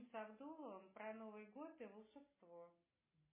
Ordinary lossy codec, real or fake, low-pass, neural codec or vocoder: MP3, 16 kbps; real; 3.6 kHz; none